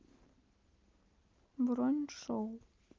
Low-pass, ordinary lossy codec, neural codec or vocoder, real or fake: 7.2 kHz; Opus, 32 kbps; none; real